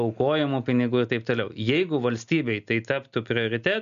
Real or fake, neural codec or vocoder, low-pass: real; none; 7.2 kHz